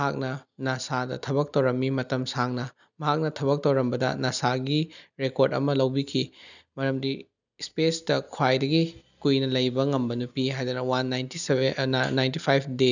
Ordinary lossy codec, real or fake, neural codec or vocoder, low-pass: none; real; none; 7.2 kHz